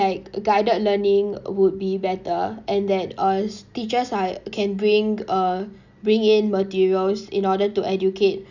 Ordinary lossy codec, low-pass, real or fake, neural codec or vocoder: none; 7.2 kHz; real; none